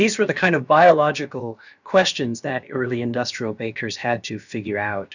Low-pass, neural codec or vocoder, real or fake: 7.2 kHz; codec, 16 kHz, about 1 kbps, DyCAST, with the encoder's durations; fake